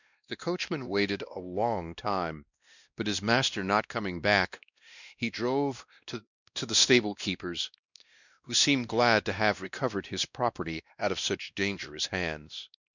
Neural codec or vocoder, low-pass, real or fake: codec, 16 kHz, 1 kbps, X-Codec, WavLM features, trained on Multilingual LibriSpeech; 7.2 kHz; fake